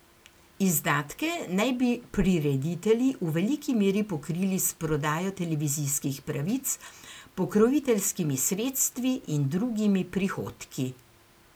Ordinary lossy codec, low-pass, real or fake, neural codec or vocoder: none; none; real; none